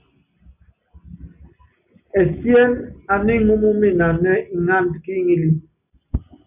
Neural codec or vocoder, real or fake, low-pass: none; real; 3.6 kHz